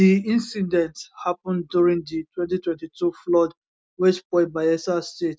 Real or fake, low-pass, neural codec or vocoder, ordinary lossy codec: real; none; none; none